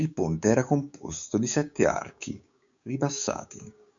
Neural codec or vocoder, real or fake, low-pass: codec, 16 kHz, 6 kbps, DAC; fake; 7.2 kHz